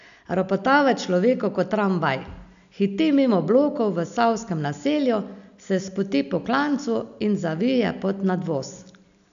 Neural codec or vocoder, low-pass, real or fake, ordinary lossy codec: none; 7.2 kHz; real; AAC, 96 kbps